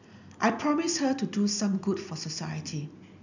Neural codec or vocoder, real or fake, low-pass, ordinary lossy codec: none; real; 7.2 kHz; none